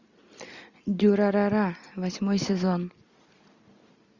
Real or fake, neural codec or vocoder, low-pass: real; none; 7.2 kHz